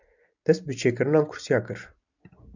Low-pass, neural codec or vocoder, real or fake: 7.2 kHz; none; real